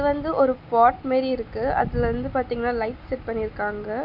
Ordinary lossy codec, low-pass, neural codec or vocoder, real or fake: none; 5.4 kHz; none; real